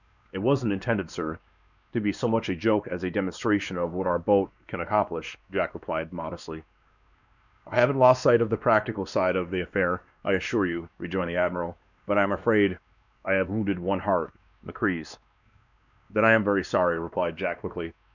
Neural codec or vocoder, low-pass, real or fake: codec, 16 kHz, 2 kbps, X-Codec, WavLM features, trained on Multilingual LibriSpeech; 7.2 kHz; fake